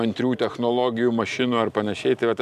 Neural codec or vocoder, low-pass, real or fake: none; 14.4 kHz; real